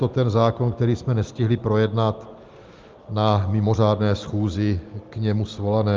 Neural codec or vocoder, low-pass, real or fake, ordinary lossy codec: none; 7.2 kHz; real; Opus, 32 kbps